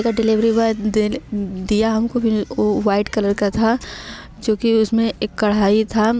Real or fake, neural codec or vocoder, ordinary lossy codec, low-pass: real; none; none; none